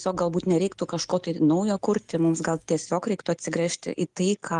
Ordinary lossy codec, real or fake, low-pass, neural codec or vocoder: Opus, 32 kbps; real; 10.8 kHz; none